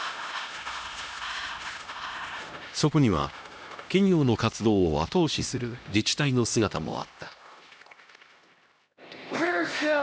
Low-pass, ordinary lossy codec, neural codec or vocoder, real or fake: none; none; codec, 16 kHz, 1 kbps, X-Codec, HuBERT features, trained on LibriSpeech; fake